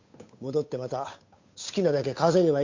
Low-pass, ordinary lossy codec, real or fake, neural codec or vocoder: 7.2 kHz; MP3, 48 kbps; fake; codec, 16 kHz, 8 kbps, FunCodec, trained on Chinese and English, 25 frames a second